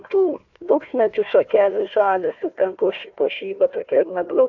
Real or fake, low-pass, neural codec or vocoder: fake; 7.2 kHz; codec, 16 kHz, 1 kbps, FunCodec, trained on Chinese and English, 50 frames a second